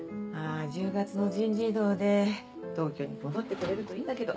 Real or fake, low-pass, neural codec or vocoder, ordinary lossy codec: real; none; none; none